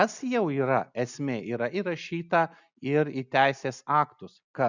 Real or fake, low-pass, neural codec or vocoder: real; 7.2 kHz; none